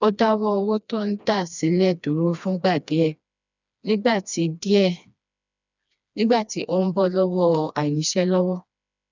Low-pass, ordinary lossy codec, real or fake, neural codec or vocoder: 7.2 kHz; none; fake; codec, 16 kHz, 2 kbps, FreqCodec, smaller model